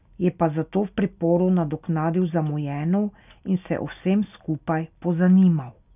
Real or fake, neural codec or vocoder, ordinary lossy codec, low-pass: real; none; none; 3.6 kHz